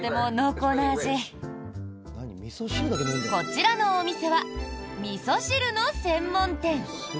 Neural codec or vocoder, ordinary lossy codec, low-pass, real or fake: none; none; none; real